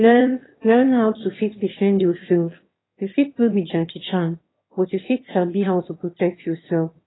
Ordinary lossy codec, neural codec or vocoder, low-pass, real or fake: AAC, 16 kbps; autoencoder, 22.05 kHz, a latent of 192 numbers a frame, VITS, trained on one speaker; 7.2 kHz; fake